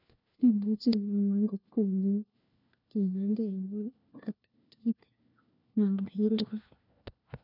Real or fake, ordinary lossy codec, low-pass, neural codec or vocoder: fake; none; 5.4 kHz; codec, 16 kHz, 1 kbps, FunCodec, trained on LibriTTS, 50 frames a second